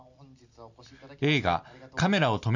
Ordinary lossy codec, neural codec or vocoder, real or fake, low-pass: none; none; real; 7.2 kHz